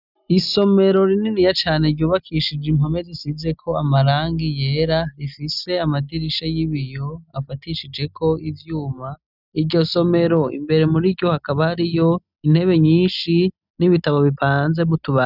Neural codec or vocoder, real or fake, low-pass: none; real; 5.4 kHz